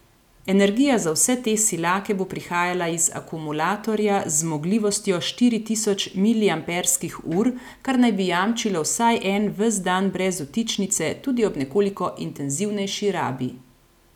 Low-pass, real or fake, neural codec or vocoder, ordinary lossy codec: 19.8 kHz; real; none; none